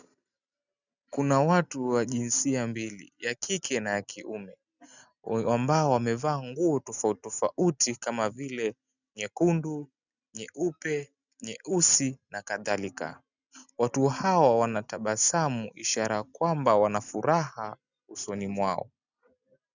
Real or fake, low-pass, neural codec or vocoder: real; 7.2 kHz; none